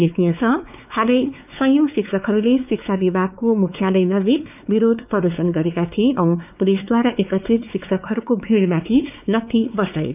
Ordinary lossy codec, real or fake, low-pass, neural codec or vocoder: none; fake; 3.6 kHz; codec, 16 kHz, 4 kbps, X-Codec, WavLM features, trained on Multilingual LibriSpeech